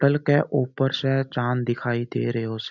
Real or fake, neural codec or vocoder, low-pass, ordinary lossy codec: real; none; 7.2 kHz; none